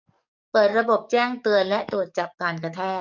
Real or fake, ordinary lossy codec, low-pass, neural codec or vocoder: fake; none; 7.2 kHz; codec, 44.1 kHz, 7.8 kbps, DAC